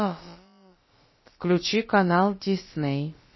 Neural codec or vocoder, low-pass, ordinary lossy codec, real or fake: codec, 16 kHz, about 1 kbps, DyCAST, with the encoder's durations; 7.2 kHz; MP3, 24 kbps; fake